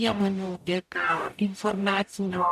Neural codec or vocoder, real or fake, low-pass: codec, 44.1 kHz, 0.9 kbps, DAC; fake; 14.4 kHz